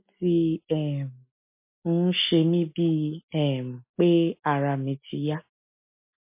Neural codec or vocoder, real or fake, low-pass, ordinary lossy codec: none; real; 3.6 kHz; MP3, 24 kbps